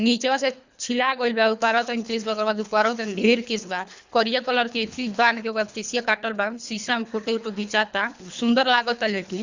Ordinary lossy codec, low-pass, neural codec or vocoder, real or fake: Opus, 64 kbps; 7.2 kHz; codec, 24 kHz, 3 kbps, HILCodec; fake